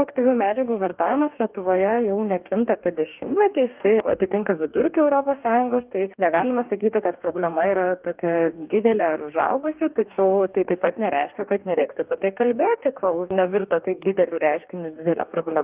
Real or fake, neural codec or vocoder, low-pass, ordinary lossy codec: fake; codec, 44.1 kHz, 2.6 kbps, DAC; 3.6 kHz; Opus, 24 kbps